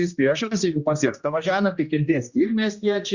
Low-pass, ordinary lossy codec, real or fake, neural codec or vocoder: 7.2 kHz; Opus, 64 kbps; fake; codec, 16 kHz, 1 kbps, X-Codec, HuBERT features, trained on general audio